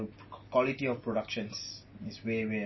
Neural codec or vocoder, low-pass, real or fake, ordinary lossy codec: none; 7.2 kHz; real; MP3, 24 kbps